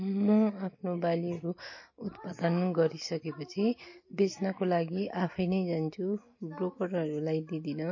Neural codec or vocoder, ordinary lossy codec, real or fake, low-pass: none; MP3, 32 kbps; real; 7.2 kHz